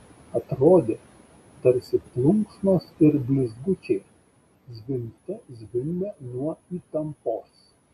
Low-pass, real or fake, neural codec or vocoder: 14.4 kHz; real; none